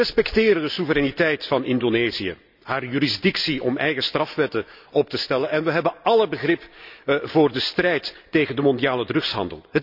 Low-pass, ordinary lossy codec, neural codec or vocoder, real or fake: 5.4 kHz; none; none; real